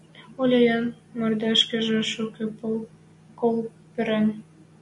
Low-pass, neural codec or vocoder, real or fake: 10.8 kHz; none; real